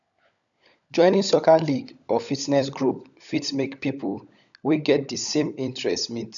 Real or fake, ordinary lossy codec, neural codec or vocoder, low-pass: fake; none; codec, 16 kHz, 16 kbps, FunCodec, trained on LibriTTS, 50 frames a second; 7.2 kHz